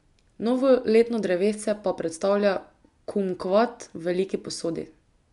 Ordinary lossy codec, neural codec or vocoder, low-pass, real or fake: none; none; 10.8 kHz; real